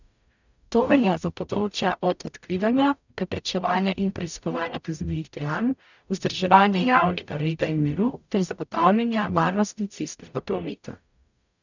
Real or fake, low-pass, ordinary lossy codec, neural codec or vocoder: fake; 7.2 kHz; none; codec, 44.1 kHz, 0.9 kbps, DAC